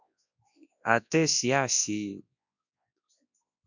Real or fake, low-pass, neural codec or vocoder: fake; 7.2 kHz; codec, 24 kHz, 0.9 kbps, WavTokenizer, large speech release